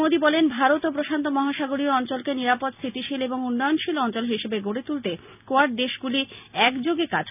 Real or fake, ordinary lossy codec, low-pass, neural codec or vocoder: real; none; 3.6 kHz; none